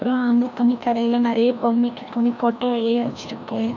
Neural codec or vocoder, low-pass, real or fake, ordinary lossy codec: codec, 16 kHz, 1 kbps, FreqCodec, larger model; 7.2 kHz; fake; none